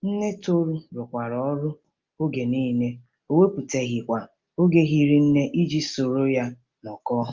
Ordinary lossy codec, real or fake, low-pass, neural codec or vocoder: Opus, 24 kbps; real; 7.2 kHz; none